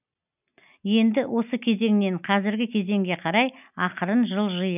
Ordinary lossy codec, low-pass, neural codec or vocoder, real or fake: none; 3.6 kHz; none; real